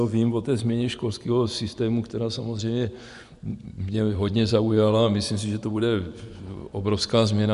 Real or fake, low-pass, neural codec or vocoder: fake; 10.8 kHz; vocoder, 24 kHz, 100 mel bands, Vocos